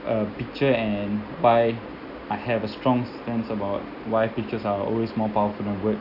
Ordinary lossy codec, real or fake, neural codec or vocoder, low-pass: none; real; none; 5.4 kHz